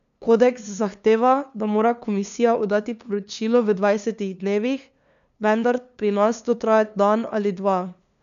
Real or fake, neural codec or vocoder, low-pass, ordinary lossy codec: fake; codec, 16 kHz, 2 kbps, FunCodec, trained on LibriTTS, 25 frames a second; 7.2 kHz; none